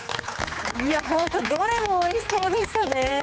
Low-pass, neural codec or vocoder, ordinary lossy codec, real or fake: none; codec, 16 kHz, 4 kbps, X-Codec, HuBERT features, trained on general audio; none; fake